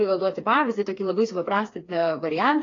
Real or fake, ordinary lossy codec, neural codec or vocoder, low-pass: fake; AAC, 32 kbps; codec, 16 kHz, 4 kbps, FreqCodec, smaller model; 7.2 kHz